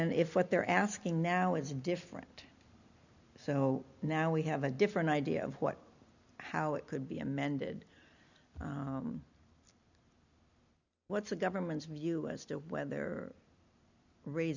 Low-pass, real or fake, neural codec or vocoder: 7.2 kHz; real; none